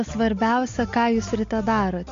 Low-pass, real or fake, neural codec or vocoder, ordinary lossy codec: 7.2 kHz; real; none; MP3, 48 kbps